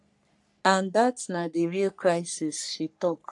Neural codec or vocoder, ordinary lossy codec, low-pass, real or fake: codec, 44.1 kHz, 3.4 kbps, Pupu-Codec; AAC, 64 kbps; 10.8 kHz; fake